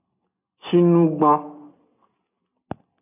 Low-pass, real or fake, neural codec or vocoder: 3.6 kHz; real; none